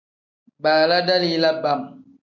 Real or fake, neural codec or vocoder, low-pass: real; none; 7.2 kHz